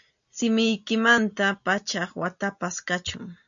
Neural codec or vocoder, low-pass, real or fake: none; 7.2 kHz; real